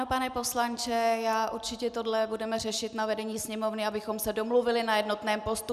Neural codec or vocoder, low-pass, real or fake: none; 14.4 kHz; real